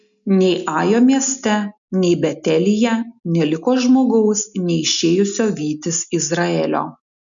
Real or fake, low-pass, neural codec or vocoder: real; 7.2 kHz; none